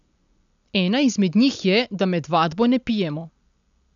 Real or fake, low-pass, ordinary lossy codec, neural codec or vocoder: real; 7.2 kHz; none; none